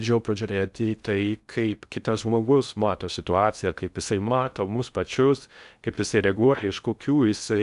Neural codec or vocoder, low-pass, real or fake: codec, 16 kHz in and 24 kHz out, 0.6 kbps, FocalCodec, streaming, 2048 codes; 10.8 kHz; fake